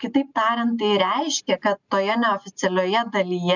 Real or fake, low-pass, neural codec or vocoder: real; 7.2 kHz; none